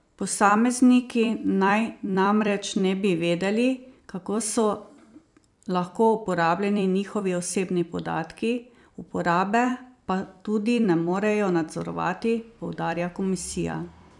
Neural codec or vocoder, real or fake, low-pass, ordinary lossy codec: vocoder, 44.1 kHz, 128 mel bands every 256 samples, BigVGAN v2; fake; 10.8 kHz; none